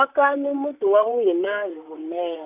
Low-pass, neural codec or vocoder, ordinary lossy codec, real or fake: 3.6 kHz; vocoder, 44.1 kHz, 128 mel bands, Pupu-Vocoder; none; fake